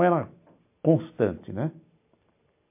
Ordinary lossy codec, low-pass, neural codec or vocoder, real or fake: MP3, 32 kbps; 3.6 kHz; none; real